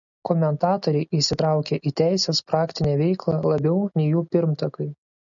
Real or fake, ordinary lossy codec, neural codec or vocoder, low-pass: real; MP3, 96 kbps; none; 7.2 kHz